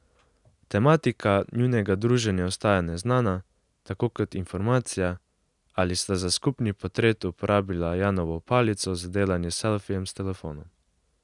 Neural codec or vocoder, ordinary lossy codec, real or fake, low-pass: none; none; real; 10.8 kHz